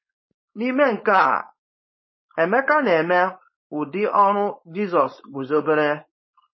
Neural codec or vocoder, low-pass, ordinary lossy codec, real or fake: codec, 16 kHz, 4.8 kbps, FACodec; 7.2 kHz; MP3, 24 kbps; fake